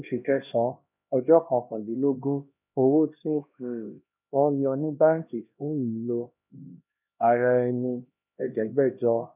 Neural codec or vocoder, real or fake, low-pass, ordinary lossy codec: codec, 16 kHz, 1 kbps, X-Codec, HuBERT features, trained on LibriSpeech; fake; 3.6 kHz; none